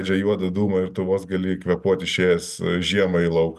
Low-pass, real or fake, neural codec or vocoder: 14.4 kHz; real; none